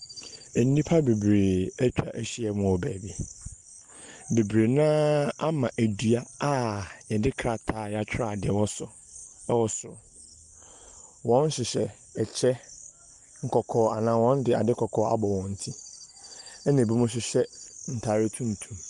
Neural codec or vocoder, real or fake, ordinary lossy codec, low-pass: none; real; Opus, 32 kbps; 10.8 kHz